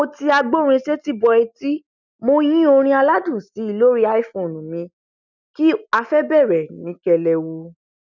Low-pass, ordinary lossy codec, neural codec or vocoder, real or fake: 7.2 kHz; none; none; real